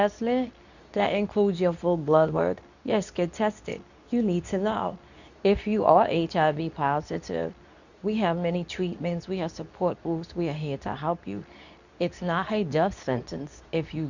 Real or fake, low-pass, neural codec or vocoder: fake; 7.2 kHz; codec, 24 kHz, 0.9 kbps, WavTokenizer, medium speech release version 2